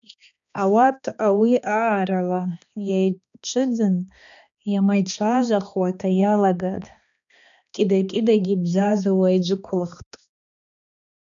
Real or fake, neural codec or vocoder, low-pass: fake; codec, 16 kHz, 2 kbps, X-Codec, HuBERT features, trained on balanced general audio; 7.2 kHz